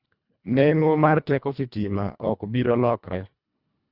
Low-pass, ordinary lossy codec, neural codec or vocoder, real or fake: 5.4 kHz; Opus, 64 kbps; codec, 24 kHz, 1.5 kbps, HILCodec; fake